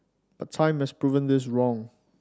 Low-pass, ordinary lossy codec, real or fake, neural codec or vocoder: none; none; real; none